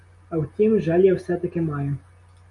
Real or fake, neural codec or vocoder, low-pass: real; none; 10.8 kHz